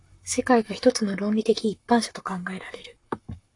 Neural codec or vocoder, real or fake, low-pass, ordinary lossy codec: codec, 44.1 kHz, 7.8 kbps, Pupu-Codec; fake; 10.8 kHz; AAC, 48 kbps